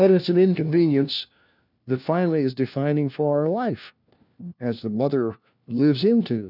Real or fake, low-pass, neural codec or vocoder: fake; 5.4 kHz; codec, 16 kHz, 1 kbps, FunCodec, trained on LibriTTS, 50 frames a second